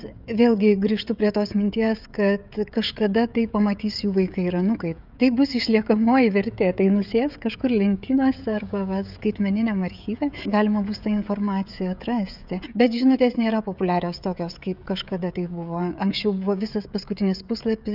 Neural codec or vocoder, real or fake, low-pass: codec, 16 kHz, 16 kbps, FreqCodec, smaller model; fake; 5.4 kHz